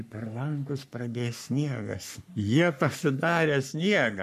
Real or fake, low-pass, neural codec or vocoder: fake; 14.4 kHz; codec, 44.1 kHz, 3.4 kbps, Pupu-Codec